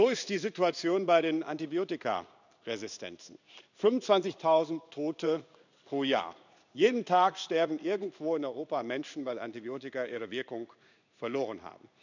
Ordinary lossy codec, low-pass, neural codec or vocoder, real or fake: none; 7.2 kHz; codec, 16 kHz in and 24 kHz out, 1 kbps, XY-Tokenizer; fake